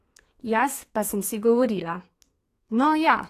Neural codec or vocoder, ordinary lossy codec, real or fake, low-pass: codec, 32 kHz, 1.9 kbps, SNAC; AAC, 48 kbps; fake; 14.4 kHz